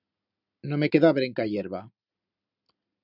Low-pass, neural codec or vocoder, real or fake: 5.4 kHz; none; real